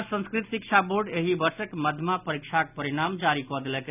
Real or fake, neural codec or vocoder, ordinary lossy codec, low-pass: real; none; none; 3.6 kHz